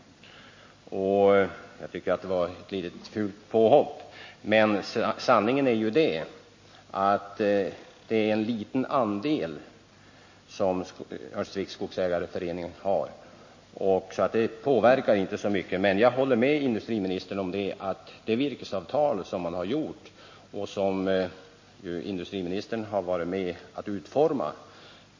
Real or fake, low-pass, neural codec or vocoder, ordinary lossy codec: real; 7.2 kHz; none; MP3, 32 kbps